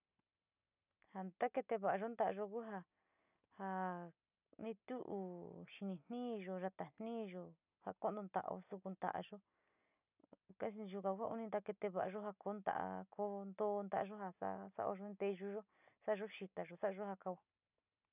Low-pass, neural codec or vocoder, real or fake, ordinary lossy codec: 3.6 kHz; none; real; none